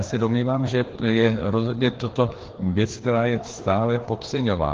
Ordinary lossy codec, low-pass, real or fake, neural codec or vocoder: Opus, 16 kbps; 7.2 kHz; fake; codec, 16 kHz, 2 kbps, FreqCodec, larger model